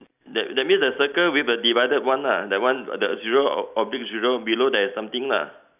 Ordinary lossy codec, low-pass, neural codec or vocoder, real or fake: none; 3.6 kHz; none; real